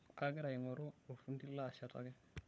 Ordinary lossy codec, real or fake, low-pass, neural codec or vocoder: none; fake; none; codec, 16 kHz, 16 kbps, FunCodec, trained on LibriTTS, 50 frames a second